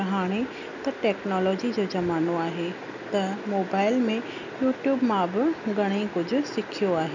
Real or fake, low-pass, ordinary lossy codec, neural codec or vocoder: real; 7.2 kHz; none; none